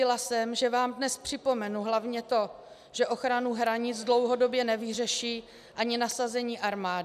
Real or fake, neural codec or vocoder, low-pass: real; none; 14.4 kHz